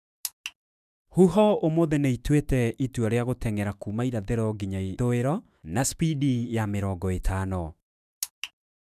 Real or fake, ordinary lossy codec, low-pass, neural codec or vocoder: fake; none; 14.4 kHz; autoencoder, 48 kHz, 128 numbers a frame, DAC-VAE, trained on Japanese speech